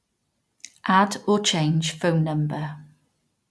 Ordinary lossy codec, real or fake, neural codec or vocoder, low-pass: none; real; none; none